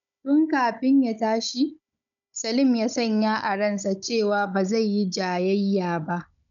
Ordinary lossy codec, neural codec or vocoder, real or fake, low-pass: none; codec, 16 kHz, 16 kbps, FunCodec, trained on Chinese and English, 50 frames a second; fake; 7.2 kHz